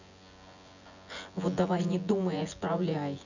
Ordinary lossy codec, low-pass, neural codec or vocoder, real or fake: none; 7.2 kHz; vocoder, 24 kHz, 100 mel bands, Vocos; fake